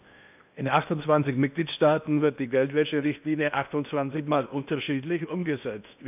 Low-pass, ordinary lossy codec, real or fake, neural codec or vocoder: 3.6 kHz; none; fake; codec, 16 kHz in and 24 kHz out, 0.8 kbps, FocalCodec, streaming, 65536 codes